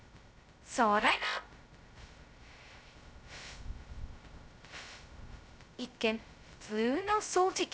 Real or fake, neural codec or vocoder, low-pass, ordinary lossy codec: fake; codec, 16 kHz, 0.2 kbps, FocalCodec; none; none